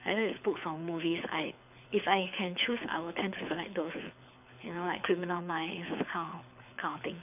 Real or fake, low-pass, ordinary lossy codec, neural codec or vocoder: fake; 3.6 kHz; none; codec, 24 kHz, 6 kbps, HILCodec